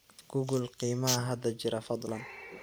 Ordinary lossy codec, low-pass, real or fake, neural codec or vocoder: none; none; fake; vocoder, 44.1 kHz, 128 mel bands every 512 samples, BigVGAN v2